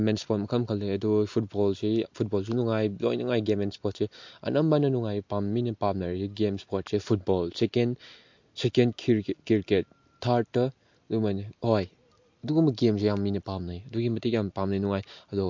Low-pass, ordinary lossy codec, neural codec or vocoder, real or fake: 7.2 kHz; MP3, 48 kbps; none; real